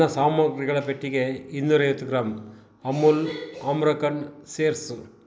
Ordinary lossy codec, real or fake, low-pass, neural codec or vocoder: none; real; none; none